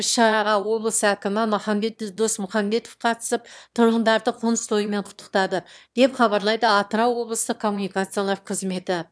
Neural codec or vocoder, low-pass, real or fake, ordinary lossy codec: autoencoder, 22.05 kHz, a latent of 192 numbers a frame, VITS, trained on one speaker; none; fake; none